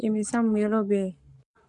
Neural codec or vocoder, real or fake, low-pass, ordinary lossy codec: codec, 44.1 kHz, 7.8 kbps, Pupu-Codec; fake; 10.8 kHz; MP3, 64 kbps